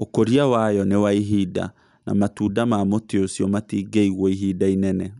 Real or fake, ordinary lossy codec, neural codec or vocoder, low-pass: real; none; none; 10.8 kHz